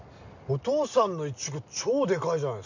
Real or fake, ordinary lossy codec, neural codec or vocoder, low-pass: real; none; none; 7.2 kHz